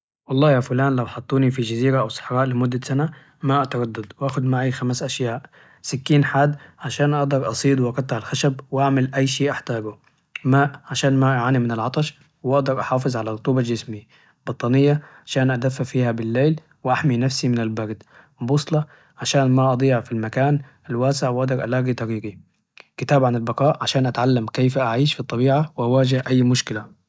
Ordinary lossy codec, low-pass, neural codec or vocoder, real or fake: none; none; none; real